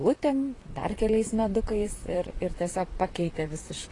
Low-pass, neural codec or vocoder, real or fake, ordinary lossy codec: 10.8 kHz; vocoder, 44.1 kHz, 128 mel bands, Pupu-Vocoder; fake; AAC, 32 kbps